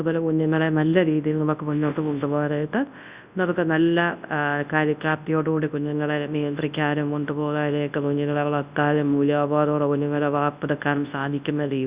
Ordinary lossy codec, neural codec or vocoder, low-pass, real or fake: Opus, 64 kbps; codec, 24 kHz, 0.9 kbps, WavTokenizer, large speech release; 3.6 kHz; fake